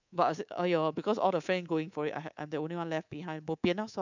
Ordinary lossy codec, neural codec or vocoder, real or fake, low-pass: none; codec, 24 kHz, 3.1 kbps, DualCodec; fake; 7.2 kHz